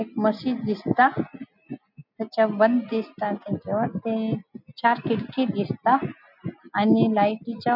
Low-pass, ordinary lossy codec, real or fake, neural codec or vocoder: 5.4 kHz; none; real; none